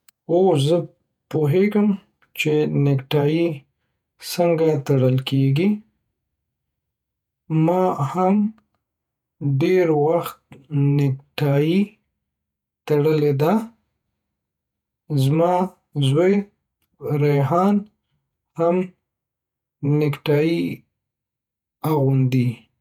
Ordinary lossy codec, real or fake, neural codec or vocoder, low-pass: none; fake; vocoder, 48 kHz, 128 mel bands, Vocos; 19.8 kHz